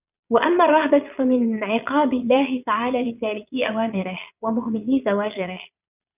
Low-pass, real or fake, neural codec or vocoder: 3.6 kHz; fake; vocoder, 22.05 kHz, 80 mel bands, Vocos